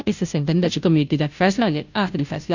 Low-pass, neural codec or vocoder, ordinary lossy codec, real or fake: 7.2 kHz; codec, 16 kHz, 0.5 kbps, FunCodec, trained on Chinese and English, 25 frames a second; none; fake